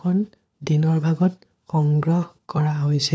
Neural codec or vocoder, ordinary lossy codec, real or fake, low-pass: codec, 16 kHz, 4 kbps, FunCodec, trained on LibriTTS, 50 frames a second; none; fake; none